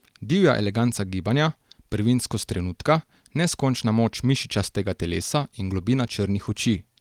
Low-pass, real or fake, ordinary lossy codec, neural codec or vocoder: 19.8 kHz; real; Opus, 24 kbps; none